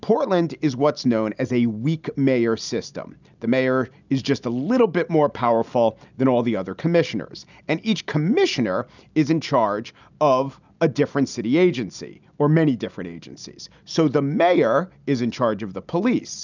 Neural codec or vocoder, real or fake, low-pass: none; real; 7.2 kHz